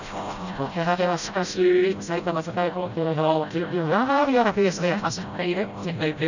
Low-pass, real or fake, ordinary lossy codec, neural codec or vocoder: 7.2 kHz; fake; none; codec, 16 kHz, 0.5 kbps, FreqCodec, smaller model